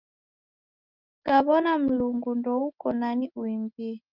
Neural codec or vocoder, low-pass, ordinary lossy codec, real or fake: none; 5.4 kHz; Opus, 24 kbps; real